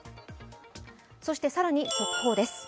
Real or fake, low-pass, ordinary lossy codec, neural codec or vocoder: real; none; none; none